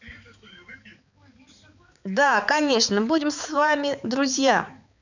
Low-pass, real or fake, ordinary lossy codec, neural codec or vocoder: 7.2 kHz; fake; none; codec, 16 kHz, 4 kbps, X-Codec, HuBERT features, trained on balanced general audio